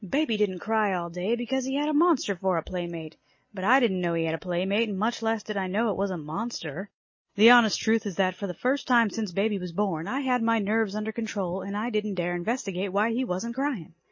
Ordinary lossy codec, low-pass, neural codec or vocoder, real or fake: MP3, 32 kbps; 7.2 kHz; none; real